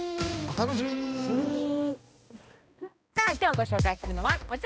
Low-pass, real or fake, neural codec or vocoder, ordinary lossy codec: none; fake; codec, 16 kHz, 1 kbps, X-Codec, HuBERT features, trained on balanced general audio; none